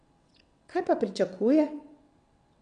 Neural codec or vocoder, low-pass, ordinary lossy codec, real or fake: vocoder, 22.05 kHz, 80 mel bands, WaveNeXt; 9.9 kHz; MP3, 96 kbps; fake